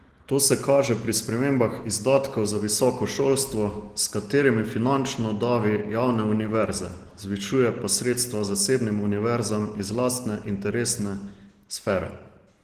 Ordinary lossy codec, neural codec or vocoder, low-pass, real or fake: Opus, 16 kbps; none; 14.4 kHz; real